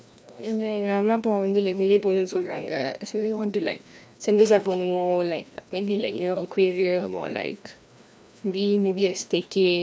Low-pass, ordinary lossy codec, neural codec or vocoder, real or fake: none; none; codec, 16 kHz, 1 kbps, FreqCodec, larger model; fake